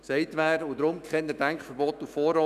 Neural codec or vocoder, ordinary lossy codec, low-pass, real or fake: autoencoder, 48 kHz, 128 numbers a frame, DAC-VAE, trained on Japanese speech; none; 14.4 kHz; fake